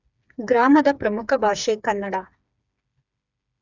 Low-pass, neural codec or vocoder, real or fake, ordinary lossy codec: 7.2 kHz; codec, 16 kHz, 4 kbps, FreqCodec, smaller model; fake; none